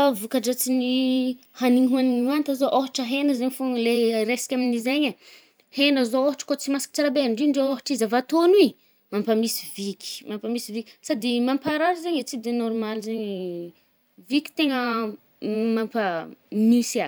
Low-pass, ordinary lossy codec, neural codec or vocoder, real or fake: none; none; vocoder, 44.1 kHz, 128 mel bands every 512 samples, BigVGAN v2; fake